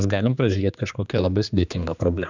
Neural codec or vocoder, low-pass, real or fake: codec, 16 kHz, 2 kbps, X-Codec, HuBERT features, trained on general audio; 7.2 kHz; fake